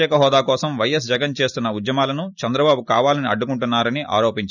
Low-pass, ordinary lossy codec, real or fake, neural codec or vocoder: 7.2 kHz; none; real; none